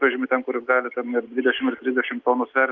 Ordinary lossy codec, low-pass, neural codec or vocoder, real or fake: Opus, 24 kbps; 7.2 kHz; none; real